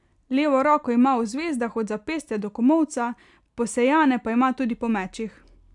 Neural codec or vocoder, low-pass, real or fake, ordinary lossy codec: none; 10.8 kHz; real; none